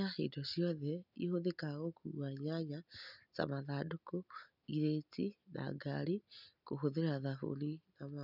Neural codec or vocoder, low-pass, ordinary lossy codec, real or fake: none; 5.4 kHz; none; real